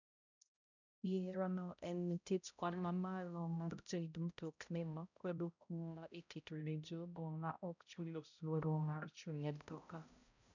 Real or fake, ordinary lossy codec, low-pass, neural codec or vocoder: fake; none; 7.2 kHz; codec, 16 kHz, 0.5 kbps, X-Codec, HuBERT features, trained on balanced general audio